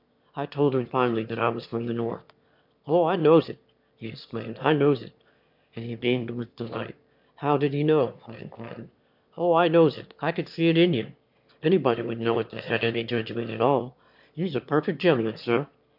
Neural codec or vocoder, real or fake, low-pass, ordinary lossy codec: autoencoder, 22.05 kHz, a latent of 192 numbers a frame, VITS, trained on one speaker; fake; 5.4 kHz; MP3, 48 kbps